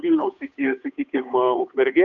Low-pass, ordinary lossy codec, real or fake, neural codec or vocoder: 7.2 kHz; MP3, 96 kbps; fake; codec, 16 kHz, 8 kbps, FunCodec, trained on Chinese and English, 25 frames a second